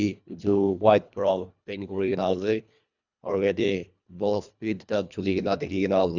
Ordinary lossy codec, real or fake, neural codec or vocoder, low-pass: none; fake; codec, 24 kHz, 1.5 kbps, HILCodec; 7.2 kHz